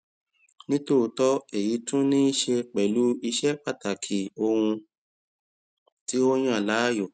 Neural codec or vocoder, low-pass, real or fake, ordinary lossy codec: none; none; real; none